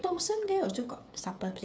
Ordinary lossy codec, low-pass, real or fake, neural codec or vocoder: none; none; fake; codec, 16 kHz, 8 kbps, FreqCodec, smaller model